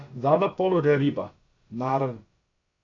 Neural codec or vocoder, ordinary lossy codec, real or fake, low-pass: codec, 16 kHz, about 1 kbps, DyCAST, with the encoder's durations; AAC, 48 kbps; fake; 7.2 kHz